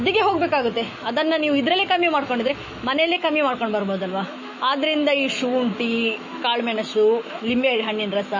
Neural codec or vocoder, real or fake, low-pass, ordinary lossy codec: autoencoder, 48 kHz, 128 numbers a frame, DAC-VAE, trained on Japanese speech; fake; 7.2 kHz; MP3, 32 kbps